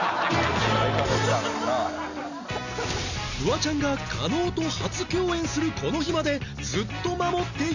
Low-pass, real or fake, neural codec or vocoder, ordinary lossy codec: 7.2 kHz; real; none; none